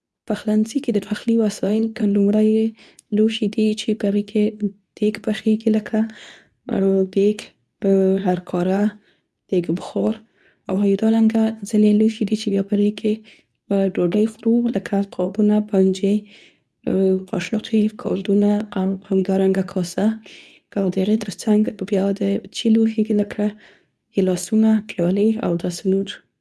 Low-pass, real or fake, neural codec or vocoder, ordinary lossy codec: none; fake; codec, 24 kHz, 0.9 kbps, WavTokenizer, medium speech release version 2; none